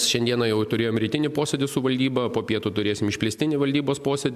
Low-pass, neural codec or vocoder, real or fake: 14.4 kHz; none; real